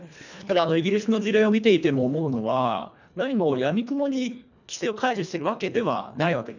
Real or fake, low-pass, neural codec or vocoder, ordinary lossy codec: fake; 7.2 kHz; codec, 24 kHz, 1.5 kbps, HILCodec; none